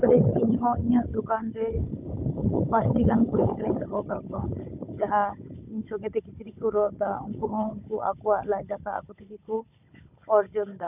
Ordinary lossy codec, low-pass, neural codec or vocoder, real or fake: none; 3.6 kHz; codec, 16 kHz, 16 kbps, FunCodec, trained on Chinese and English, 50 frames a second; fake